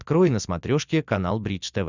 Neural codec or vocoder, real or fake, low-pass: none; real; 7.2 kHz